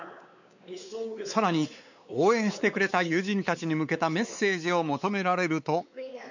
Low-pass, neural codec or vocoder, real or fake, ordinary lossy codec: 7.2 kHz; codec, 16 kHz, 4 kbps, X-Codec, WavLM features, trained on Multilingual LibriSpeech; fake; none